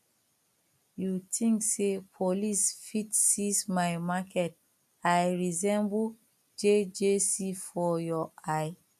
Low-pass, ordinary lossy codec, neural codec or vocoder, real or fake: 14.4 kHz; none; none; real